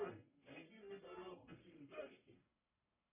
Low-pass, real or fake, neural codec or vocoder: 3.6 kHz; fake; codec, 44.1 kHz, 1.7 kbps, Pupu-Codec